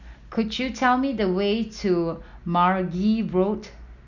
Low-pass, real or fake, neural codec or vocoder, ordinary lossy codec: 7.2 kHz; real; none; none